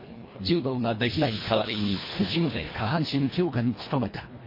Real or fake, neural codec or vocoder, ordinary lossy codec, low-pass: fake; codec, 24 kHz, 1.5 kbps, HILCodec; MP3, 24 kbps; 5.4 kHz